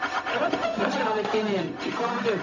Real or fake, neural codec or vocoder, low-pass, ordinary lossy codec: fake; codec, 16 kHz, 0.4 kbps, LongCat-Audio-Codec; 7.2 kHz; none